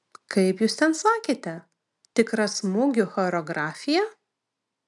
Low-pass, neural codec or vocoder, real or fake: 10.8 kHz; none; real